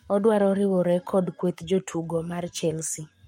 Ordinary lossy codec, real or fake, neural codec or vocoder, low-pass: MP3, 64 kbps; fake; codec, 44.1 kHz, 7.8 kbps, Pupu-Codec; 19.8 kHz